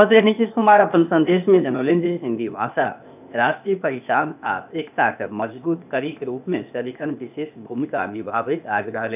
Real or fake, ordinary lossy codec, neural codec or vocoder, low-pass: fake; none; codec, 16 kHz, 0.8 kbps, ZipCodec; 3.6 kHz